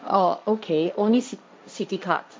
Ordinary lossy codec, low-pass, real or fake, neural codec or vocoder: none; none; fake; codec, 16 kHz, 1.1 kbps, Voila-Tokenizer